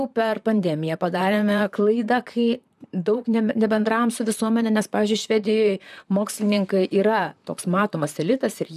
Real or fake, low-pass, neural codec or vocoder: fake; 14.4 kHz; vocoder, 44.1 kHz, 128 mel bands, Pupu-Vocoder